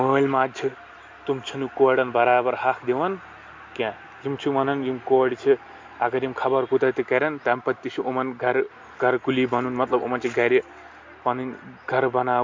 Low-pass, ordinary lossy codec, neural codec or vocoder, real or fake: 7.2 kHz; MP3, 48 kbps; none; real